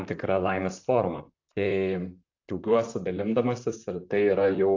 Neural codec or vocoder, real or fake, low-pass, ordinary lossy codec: vocoder, 44.1 kHz, 128 mel bands, Pupu-Vocoder; fake; 7.2 kHz; AAC, 48 kbps